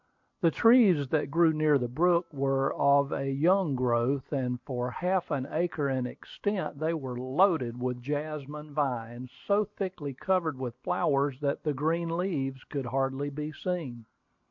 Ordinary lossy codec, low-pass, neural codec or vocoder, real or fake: MP3, 48 kbps; 7.2 kHz; none; real